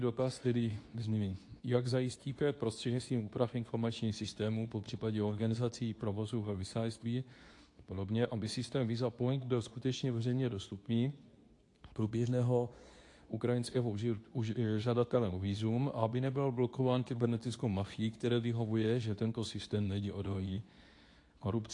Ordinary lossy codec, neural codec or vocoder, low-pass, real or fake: AAC, 48 kbps; codec, 24 kHz, 0.9 kbps, WavTokenizer, medium speech release version 2; 10.8 kHz; fake